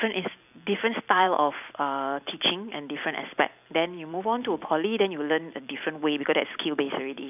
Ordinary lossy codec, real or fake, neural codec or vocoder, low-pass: none; fake; vocoder, 44.1 kHz, 128 mel bands every 256 samples, BigVGAN v2; 3.6 kHz